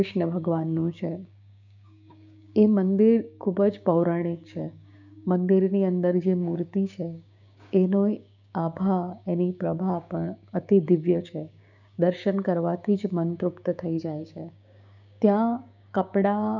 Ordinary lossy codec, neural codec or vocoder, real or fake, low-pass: none; codec, 16 kHz, 6 kbps, DAC; fake; 7.2 kHz